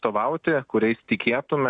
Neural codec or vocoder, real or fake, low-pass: none; real; 9.9 kHz